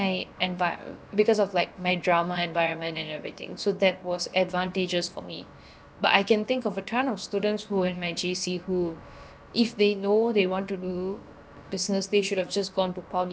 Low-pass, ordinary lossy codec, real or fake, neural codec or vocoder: none; none; fake; codec, 16 kHz, about 1 kbps, DyCAST, with the encoder's durations